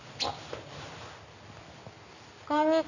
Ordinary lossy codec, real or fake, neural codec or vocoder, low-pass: none; real; none; 7.2 kHz